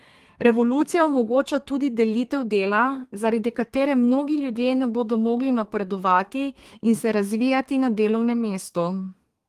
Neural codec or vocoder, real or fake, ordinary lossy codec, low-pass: codec, 32 kHz, 1.9 kbps, SNAC; fake; Opus, 24 kbps; 14.4 kHz